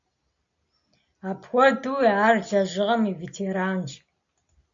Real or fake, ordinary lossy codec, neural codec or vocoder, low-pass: real; AAC, 64 kbps; none; 7.2 kHz